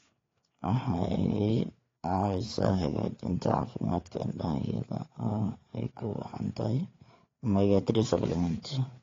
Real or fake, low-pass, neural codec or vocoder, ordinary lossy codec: fake; 7.2 kHz; codec, 16 kHz, 4 kbps, FreqCodec, larger model; AAC, 32 kbps